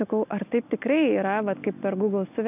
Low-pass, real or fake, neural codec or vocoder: 3.6 kHz; real; none